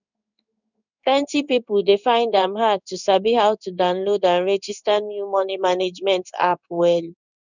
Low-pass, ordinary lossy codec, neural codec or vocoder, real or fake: 7.2 kHz; none; codec, 16 kHz in and 24 kHz out, 1 kbps, XY-Tokenizer; fake